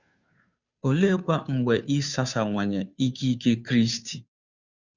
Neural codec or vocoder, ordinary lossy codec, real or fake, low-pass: codec, 16 kHz, 2 kbps, FunCodec, trained on Chinese and English, 25 frames a second; Opus, 64 kbps; fake; 7.2 kHz